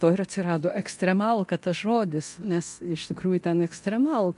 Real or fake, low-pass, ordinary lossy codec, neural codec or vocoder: fake; 10.8 kHz; MP3, 64 kbps; codec, 24 kHz, 0.9 kbps, DualCodec